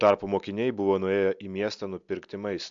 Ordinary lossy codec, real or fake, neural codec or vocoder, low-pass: AAC, 64 kbps; real; none; 7.2 kHz